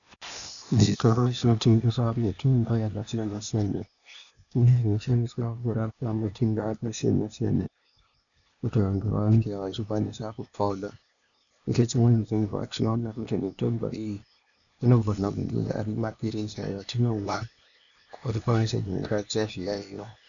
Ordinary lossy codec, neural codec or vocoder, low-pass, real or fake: MP3, 96 kbps; codec, 16 kHz, 0.8 kbps, ZipCodec; 7.2 kHz; fake